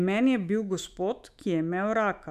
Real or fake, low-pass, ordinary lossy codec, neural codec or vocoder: fake; 14.4 kHz; none; autoencoder, 48 kHz, 128 numbers a frame, DAC-VAE, trained on Japanese speech